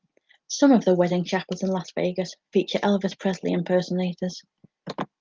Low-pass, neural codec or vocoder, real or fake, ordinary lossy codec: 7.2 kHz; none; real; Opus, 32 kbps